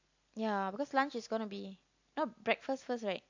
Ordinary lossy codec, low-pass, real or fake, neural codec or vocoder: AAC, 48 kbps; 7.2 kHz; real; none